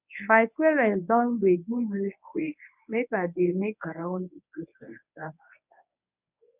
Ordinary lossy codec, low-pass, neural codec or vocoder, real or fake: none; 3.6 kHz; codec, 24 kHz, 0.9 kbps, WavTokenizer, medium speech release version 1; fake